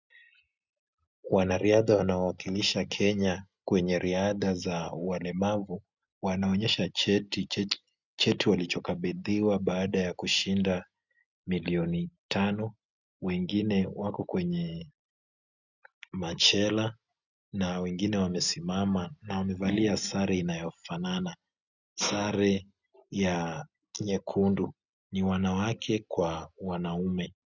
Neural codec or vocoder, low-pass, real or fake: none; 7.2 kHz; real